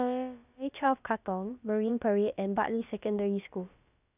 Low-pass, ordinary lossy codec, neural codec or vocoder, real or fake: 3.6 kHz; none; codec, 16 kHz, about 1 kbps, DyCAST, with the encoder's durations; fake